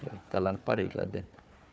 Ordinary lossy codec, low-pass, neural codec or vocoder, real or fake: none; none; codec, 16 kHz, 4 kbps, FunCodec, trained on Chinese and English, 50 frames a second; fake